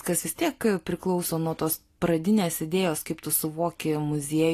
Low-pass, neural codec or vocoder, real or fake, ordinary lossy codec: 14.4 kHz; none; real; AAC, 48 kbps